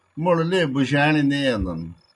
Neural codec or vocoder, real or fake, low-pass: none; real; 10.8 kHz